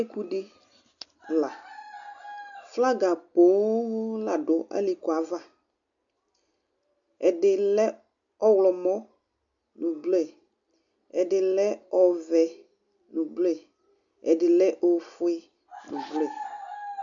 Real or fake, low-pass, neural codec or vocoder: real; 7.2 kHz; none